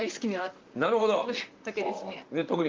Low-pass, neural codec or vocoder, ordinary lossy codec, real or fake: 7.2 kHz; vocoder, 44.1 kHz, 128 mel bands, Pupu-Vocoder; Opus, 32 kbps; fake